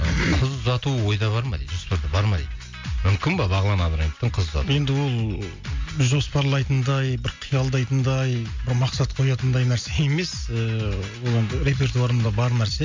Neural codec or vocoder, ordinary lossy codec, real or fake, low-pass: none; MP3, 48 kbps; real; 7.2 kHz